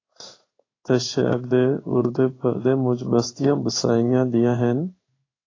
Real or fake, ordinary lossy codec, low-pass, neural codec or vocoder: fake; AAC, 32 kbps; 7.2 kHz; codec, 16 kHz in and 24 kHz out, 1 kbps, XY-Tokenizer